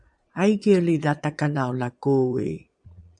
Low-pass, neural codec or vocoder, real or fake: 9.9 kHz; vocoder, 22.05 kHz, 80 mel bands, Vocos; fake